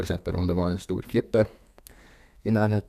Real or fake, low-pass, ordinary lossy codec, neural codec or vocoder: fake; 14.4 kHz; none; codec, 32 kHz, 1.9 kbps, SNAC